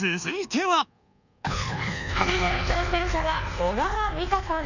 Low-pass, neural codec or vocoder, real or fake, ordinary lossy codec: 7.2 kHz; codec, 24 kHz, 1.2 kbps, DualCodec; fake; none